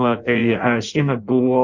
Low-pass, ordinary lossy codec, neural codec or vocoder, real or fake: 7.2 kHz; Opus, 64 kbps; codec, 16 kHz in and 24 kHz out, 0.6 kbps, FireRedTTS-2 codec; fake